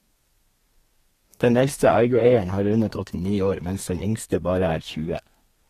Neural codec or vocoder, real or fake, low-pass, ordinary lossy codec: codec, 32 kHz, 1.9 kbps, SNAC; fake; 14.4 kHz; AAC, 48 kbps